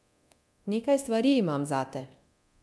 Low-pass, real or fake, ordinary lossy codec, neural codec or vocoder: none; fake; none; codec, 24 kHz, 0.9 kbps, DualCodec